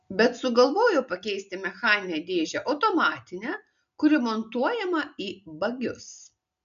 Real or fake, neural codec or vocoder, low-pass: real; none; 7.2 kHz